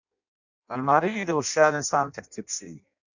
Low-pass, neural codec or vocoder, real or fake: 7.2 kHz; codec, 16 kHz in and 24 kHz out, 0.6 kbps, FireRedTTS-2 codec; fake